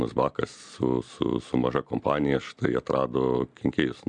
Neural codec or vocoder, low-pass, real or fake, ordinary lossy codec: none; 9.9 kHz; real; MP3, 96 kbps